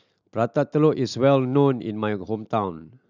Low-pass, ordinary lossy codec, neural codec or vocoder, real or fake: 7.2 kHz; none; none; real